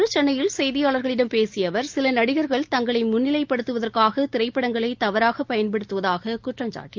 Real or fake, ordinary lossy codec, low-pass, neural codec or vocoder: real; Opus, 24 kbps; 7.2 kHz; none